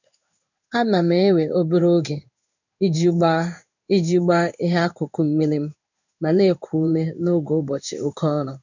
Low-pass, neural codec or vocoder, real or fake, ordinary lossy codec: 7.2 kHz; codec, 16 kHz in and 24 kHz out, 1 kbps, XY-Tokenizer; fake; none